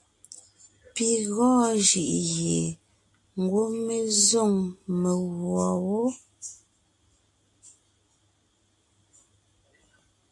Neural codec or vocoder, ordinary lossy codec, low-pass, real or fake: none; AAC, 48 kbps; 10.8 kHz; real